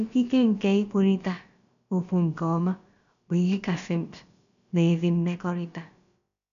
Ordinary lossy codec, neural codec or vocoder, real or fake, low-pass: none; codec, 16 kHz, about 1 kbps, DyCAST, with the encoder's durations; fake; 7.2 kHz